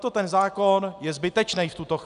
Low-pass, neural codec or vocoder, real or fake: 10.8 kHz; none; real